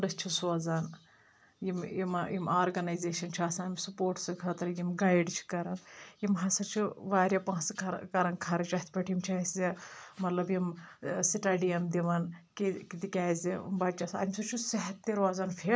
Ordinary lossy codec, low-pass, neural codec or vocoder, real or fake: none; none; none; real